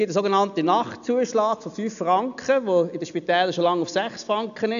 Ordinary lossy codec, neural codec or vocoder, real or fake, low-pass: none; none; real; 7.2 kHz